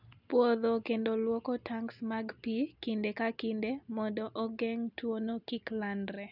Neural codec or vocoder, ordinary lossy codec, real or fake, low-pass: none; none; real; 5.4 kHz